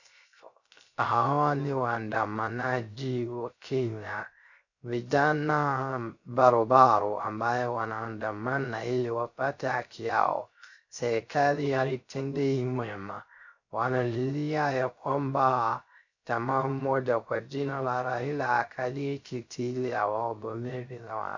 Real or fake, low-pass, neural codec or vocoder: fake; 7.2 kHz; codec, 16 kHz, 0.3 kbps, FocalCodec